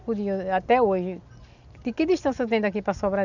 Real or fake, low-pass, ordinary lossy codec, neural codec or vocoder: real; 7.2 kHz; none; none